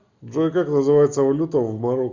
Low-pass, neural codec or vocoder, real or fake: 7.2 kHz; none; real